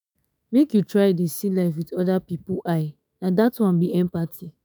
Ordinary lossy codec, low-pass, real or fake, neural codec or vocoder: none; none; fake; autoencoder, 48 kHz, 128 numbers a frame, DAC-VAE, trained on Japanese speech